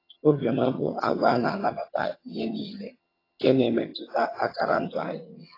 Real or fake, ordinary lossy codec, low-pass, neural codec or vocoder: fake; AAC, 24 kbps; 5.4 kHz; vocoder, 22.05 kHz, 80 mel bands, HiFi-GAN